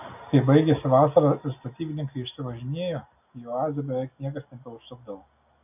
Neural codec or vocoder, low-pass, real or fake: none; 3.6 kHz; real